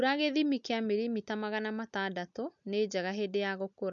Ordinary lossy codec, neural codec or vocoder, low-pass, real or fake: none; none; 7.2 kHz; real